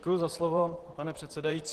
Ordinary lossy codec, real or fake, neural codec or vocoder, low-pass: Opus, 16 kbps; fake; vocoder, 44.1 kHz, 128 mel bands, Pupu-Vocoder; 14.4 kHz